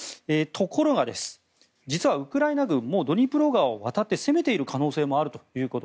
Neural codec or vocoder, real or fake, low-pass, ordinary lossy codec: none; real; none; none